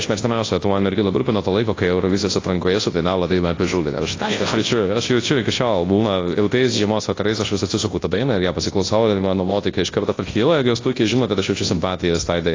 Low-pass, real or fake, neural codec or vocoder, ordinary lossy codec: 7.2 kHz; fake; codec, 24 kHz, 0.9 kbps, WavTokenizer, large speech release; AAC, 32 kbps